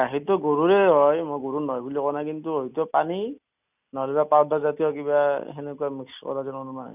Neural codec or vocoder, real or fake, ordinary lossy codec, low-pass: none; real; none; 3.6 kHz